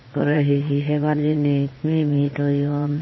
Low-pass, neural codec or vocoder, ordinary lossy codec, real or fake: 7.2 kHz; vocoder, 22.05 kHz, 80 mel bands, Vocos; MP3, 24 kbps; fake